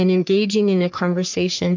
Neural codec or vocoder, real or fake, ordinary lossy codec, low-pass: codec, 44.1 kHz, 3.4 kbps, Pupu-Codec; fake; MP3, 64 kbps; 7.2 kHz